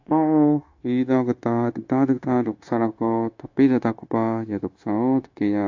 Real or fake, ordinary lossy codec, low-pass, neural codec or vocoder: fake; AAC, 48 kbps; 7.2 kHz; codec, 16 kHz, 0.9 kbps, LongCat-Audio-Codec